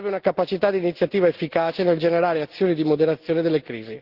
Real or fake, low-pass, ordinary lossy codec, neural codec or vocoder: real; 5.4 kHz; Opus, 16 kbps; none